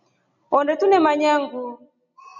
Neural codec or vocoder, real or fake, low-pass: none; real; 7.2 kHz